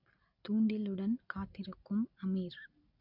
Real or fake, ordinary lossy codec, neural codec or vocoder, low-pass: real; none; none; 5.4 kHz